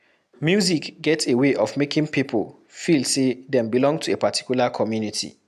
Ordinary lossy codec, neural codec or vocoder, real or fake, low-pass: none; none; real; 14.4 kHz